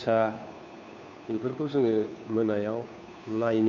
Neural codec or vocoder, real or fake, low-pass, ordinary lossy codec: codec, 16 kHz, 2 kbps, FunCodec, trained on Chinese and English, 25 frames a second; fake; 7.2 kHz; none